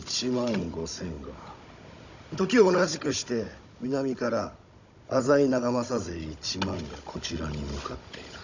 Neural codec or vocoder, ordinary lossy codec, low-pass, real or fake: codec, 16 kHz, 16 kbps, FunCodec, trained on Chinese and English, 50 frames a second; none; 7.2 kHz; fake